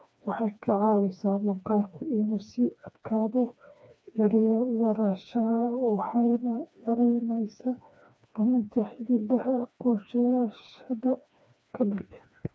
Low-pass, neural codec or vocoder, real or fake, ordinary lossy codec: none; codec, 16 kHz, 2 kbps, FreqCodec, smaller model; fake; none